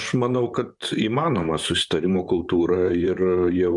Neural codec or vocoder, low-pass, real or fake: vocoder, 44.1 kHz, 128 mel bands, Pupu-Vocoder; 10.8 kHz; fake